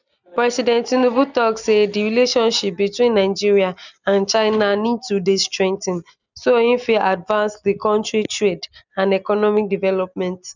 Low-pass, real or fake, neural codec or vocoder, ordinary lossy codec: 7.2 kHz; real; none; none